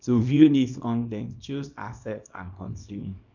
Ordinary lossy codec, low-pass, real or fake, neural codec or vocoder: none; 7.2 kHz; fake; codec, 24 kHz, 0.9 kbps, WavTokenizer, small release